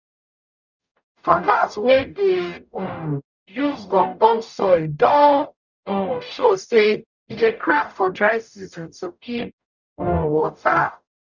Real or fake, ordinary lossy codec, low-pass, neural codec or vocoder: fake; none; 7.2 kHz; codec, 44.1 kHz, 0.9 kbps, DAC